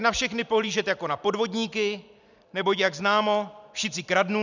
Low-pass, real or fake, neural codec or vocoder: 7.2 kHz; real; none